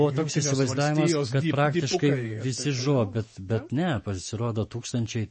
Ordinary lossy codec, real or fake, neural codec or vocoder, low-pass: MP3, 32 kbps; fake; autoencoder, 48 kHz, 128 numbers a frame, DAC-VAE, trained on Japanese speech; 10.8 kHz